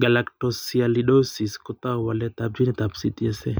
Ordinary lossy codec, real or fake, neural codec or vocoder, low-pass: none; real; none; none